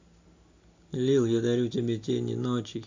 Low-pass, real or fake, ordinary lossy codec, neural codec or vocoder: 7.2 kHz; real; AAC, 48 kbps; none